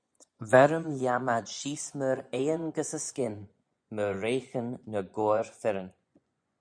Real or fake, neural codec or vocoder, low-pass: fake; vocoder, 24 kHz, 100 mel bands, Vocos; 9.9 kHz